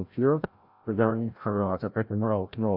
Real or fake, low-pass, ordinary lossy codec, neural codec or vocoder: fake; 5.4 kHz; AAC, 48 kbps; codec, 16 kHz, 0.5 kbps, FreqCodec, larger model